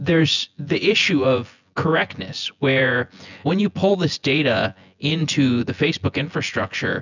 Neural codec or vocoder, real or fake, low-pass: vocoder, 24 kHz, 100 mel bands, Vocos; fake; 7.2 kHz